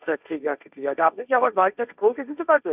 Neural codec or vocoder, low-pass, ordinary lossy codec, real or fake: codec, 16 kHz, 1.1 kbps, Voila-Tokenizer; 3.6 kHz; none; fake